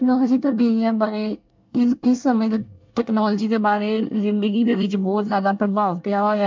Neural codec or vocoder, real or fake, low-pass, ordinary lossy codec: codec, 24 kHz, 1 kbps, SNAC; fake; 7.2 kHz; MP3, 64 kbps